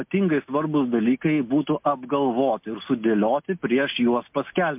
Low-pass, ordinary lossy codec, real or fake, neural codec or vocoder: 3.6 kHz; MP3, 32 kbps; real; none